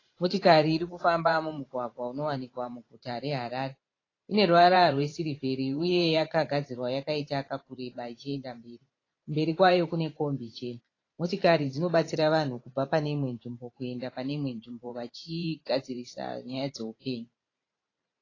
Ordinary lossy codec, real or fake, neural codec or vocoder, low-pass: AAC, 32 kbps; fake; vocoder, 44.1 kHz, 128 mel bands every 512 samples, BigVGAN v2; 7.2 kHz